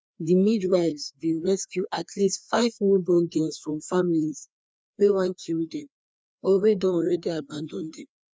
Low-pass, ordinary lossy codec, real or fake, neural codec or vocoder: none; none; fake; codec, 16 kHz, 2 kbps, FreqCodec, larger model